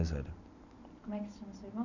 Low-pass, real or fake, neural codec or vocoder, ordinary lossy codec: 7.2 kHz; real; none; none